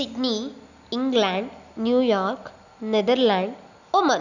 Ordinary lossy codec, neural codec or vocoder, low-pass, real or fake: none; none; 7.2 kHz; real